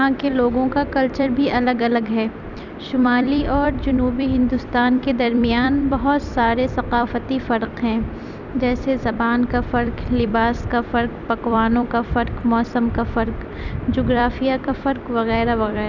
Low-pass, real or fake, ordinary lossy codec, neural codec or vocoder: 7.2 kHz; fake; none; vocoder, 44.1 kHz, 128 mel bands every 256 samples, BigVGAN v2